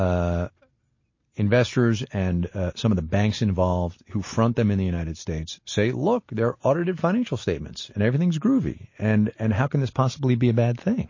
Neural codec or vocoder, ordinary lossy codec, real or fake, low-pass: none; MP3, 32 kbps; real; 7.2 kHz